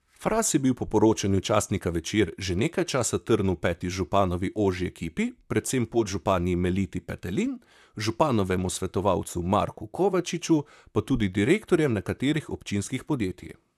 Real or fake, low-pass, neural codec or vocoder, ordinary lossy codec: fake; 14.4 kHz; vocoder, 44.1 kHz, 128 mel bands, Pupu-Vocoder; none